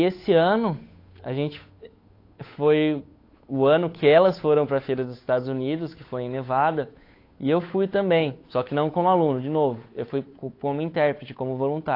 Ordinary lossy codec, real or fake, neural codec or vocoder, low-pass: AAC, 32 kbps; fake; codec, 16 kHz, 8 kbps, FunCodec, trained on Chinese and English, 25 frames a second; 5.4 kHz